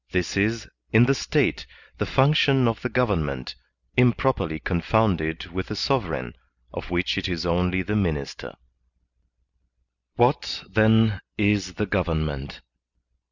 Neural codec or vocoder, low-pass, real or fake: none; 7.2 kHz; real